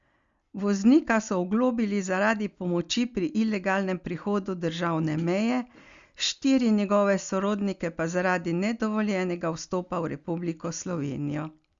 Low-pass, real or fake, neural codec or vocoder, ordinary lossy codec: 7.2 kHz; real; none; Opus, 64 kbps